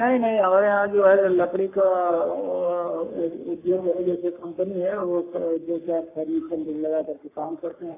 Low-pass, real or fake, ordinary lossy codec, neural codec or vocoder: 3.6 kHz; fake; AAC, 24 kbps; codec, 44.1 kHz, 3.4 kbps, Pupu-Codec